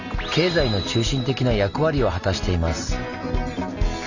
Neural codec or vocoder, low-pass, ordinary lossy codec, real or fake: none; 7.2 kHz; none; real